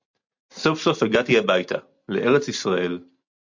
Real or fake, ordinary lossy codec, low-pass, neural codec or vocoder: real; MP3, 48 kbps; 7.2 kHz; none